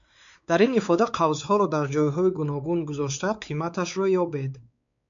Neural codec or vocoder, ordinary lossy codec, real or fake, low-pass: codec, 16 kHz, 4 kbps, X-Codec, WavLM features, trained on Multilingual LibriSpeech; MP3, 64 kbps; fake; 7.2 kHz